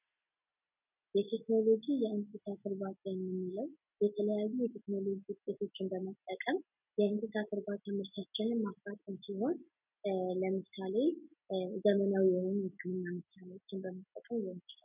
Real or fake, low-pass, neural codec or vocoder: real; 3.6 kHz; none